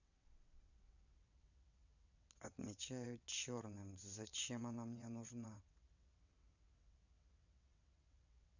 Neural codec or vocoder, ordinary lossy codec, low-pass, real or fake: vocoder, 22.05 kHz, 80 mel bands, WaveNeXt; none; 7.2 kHz; fake